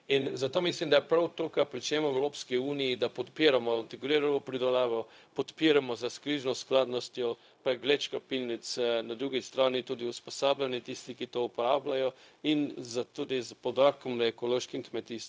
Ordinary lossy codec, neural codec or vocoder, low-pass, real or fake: none; codec, 16 kHz, 0.4 kbps, LongCat-Audio-Codec; none; fake